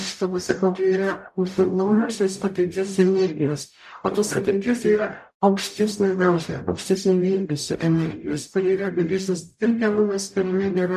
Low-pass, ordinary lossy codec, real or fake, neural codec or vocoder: 14.4 kHz; MP3, 96 kbps; fake; codec, 44.1 kHz, 0.9 kbps, DAC